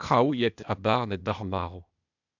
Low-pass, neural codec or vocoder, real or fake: 7.2 kHz; codec, 16 kHz, 0.8 kbps, ZipCodec; fake